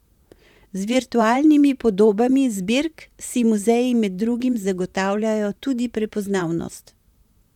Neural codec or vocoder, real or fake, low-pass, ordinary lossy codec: vocoder, 44.1 kHz, 128 mel bands, Pupu-Vocoder; fake; 19.8 kHz; none